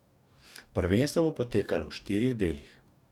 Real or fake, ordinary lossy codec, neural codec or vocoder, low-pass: fake; none; codec, 44.1 kHz, 2.6 kbps, DAC; 19.8 kHz